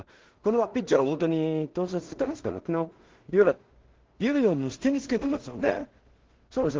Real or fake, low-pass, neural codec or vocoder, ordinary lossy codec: fake; 7.2 kHz; codec, 16 kHz in and 24 kHz out, 0.4 kbps, LongCat-Audio-Codec, two codebook decoder; Opus, 16 kbps